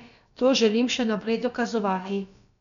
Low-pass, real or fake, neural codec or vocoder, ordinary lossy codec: 7.2 kHz; fake; codec, 16 kHz, about 1 kbps, DyCAST, with the encoder's durations; none